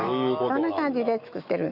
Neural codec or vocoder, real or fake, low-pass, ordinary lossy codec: autoencoder, 48 kHz, 128 numbers a frame, DAC-VAE, trained on Japanese speech; fake; 5.4 kHz; none